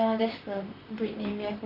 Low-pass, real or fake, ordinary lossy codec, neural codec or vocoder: 5.4 kHz; fake; none; vocoder, 44.1 kHz, 128 mel bands, Pupu-Vocoder